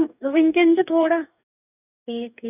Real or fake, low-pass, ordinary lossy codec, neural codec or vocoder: fake; 3.6 kHz; none; codec, 44.1 kHz, 2.6 kbps, DAC